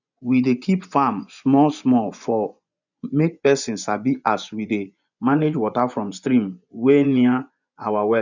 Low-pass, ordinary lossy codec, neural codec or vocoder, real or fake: 7.2 kHz; none; vocoder, 24 kHz, 100 mel bands, Vocos; fake